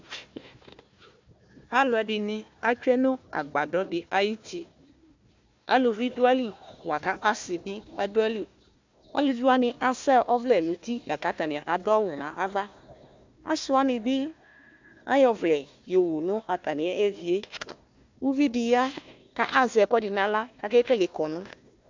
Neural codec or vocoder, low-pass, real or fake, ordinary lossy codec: codec, 16 kHz, 1 kbps, FunCodec, trained on Chinese and English, 50 frames a second; 7.2 kHz; fake; MP3, 64 kbps